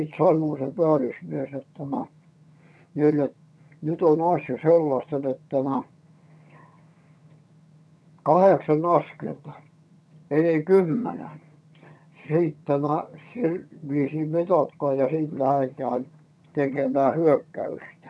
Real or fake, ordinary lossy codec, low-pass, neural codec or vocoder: fake; none; none; vocoder, 22.05 kHz, 80 mel bands, HiFi-GAN